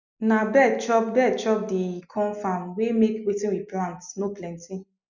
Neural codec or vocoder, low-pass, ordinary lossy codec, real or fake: none; 7.2 kHz; none; real